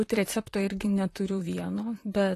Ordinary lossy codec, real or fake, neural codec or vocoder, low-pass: AAC, 48 kbps; fake; vocoder, 44.1 kHz, 128 mel bands, Pupu-Vocoder; 14.4 kHz